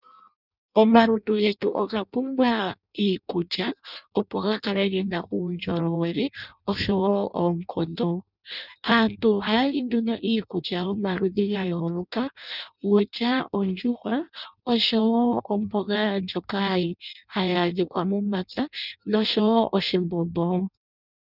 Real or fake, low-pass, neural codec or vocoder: fake; 5.4 kHz; codec, 16 kHz in and 24 kHz out, 0.6 kbps, FireRedTTS-2 codec